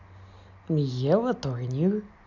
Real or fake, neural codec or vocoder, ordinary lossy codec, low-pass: real; none; none; 7.2 kHz